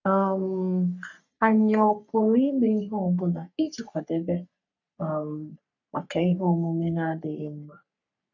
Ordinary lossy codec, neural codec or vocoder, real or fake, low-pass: none; codec, 44.1 kHz, 3.4 kbps, Pupu-Codec; fake; 7.2 kHz